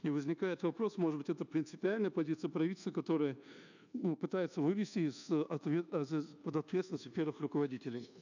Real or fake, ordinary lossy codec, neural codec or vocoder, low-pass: fake; none; codec, 24 kHz, 1.2 kbps, DualCodec; 7.2 kHz